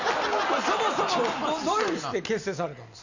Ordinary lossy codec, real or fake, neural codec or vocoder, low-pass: Opus, 64 kbps; real; none; 7.2 kHz